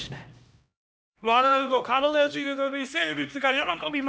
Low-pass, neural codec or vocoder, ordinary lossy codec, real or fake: none; codec, 16 kHz, 1 kbps, X-Codec, HuBERT features, trained on LibriSpeech; none; fake